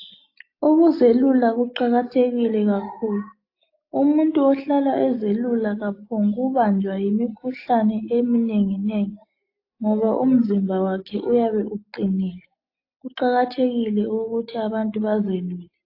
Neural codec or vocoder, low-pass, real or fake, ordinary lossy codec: none; 5.4 kHz; real; AAC, 32 kbps